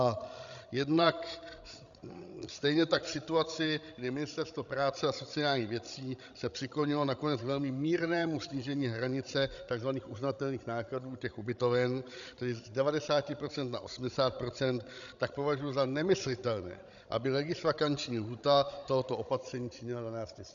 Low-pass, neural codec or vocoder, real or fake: 7.2 kHz; codec, 16 kHz, 16 kbps, FreqCodec, larger model; fake